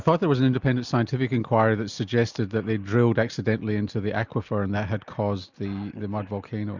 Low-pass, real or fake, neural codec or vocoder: 7.2 kHz; real; none